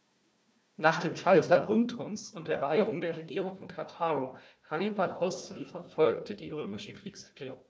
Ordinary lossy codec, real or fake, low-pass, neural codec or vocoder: none; fake; none; codec, 16 kHz, 1 kbps, FunCodec, trained on Chinese and English, 50 frames a second